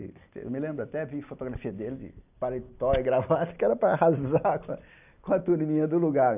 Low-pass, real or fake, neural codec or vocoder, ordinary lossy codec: 3.6 kHz; real; none; none